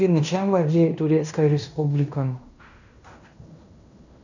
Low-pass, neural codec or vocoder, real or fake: 7.2 kHz; codec, 16 kHz in and 24 kHz out, 0.9 kbps, LongCat-Audio-Codec, fine tuned four codebook decoder; fake